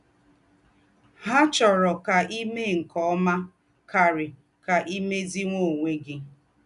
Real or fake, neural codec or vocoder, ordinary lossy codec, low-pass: real; none; none; 10.8 kHz